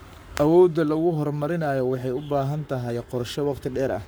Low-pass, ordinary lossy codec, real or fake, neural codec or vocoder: none; none; fake; codec, 44.1 kHz, 7.8 kbps, Pupu-Codec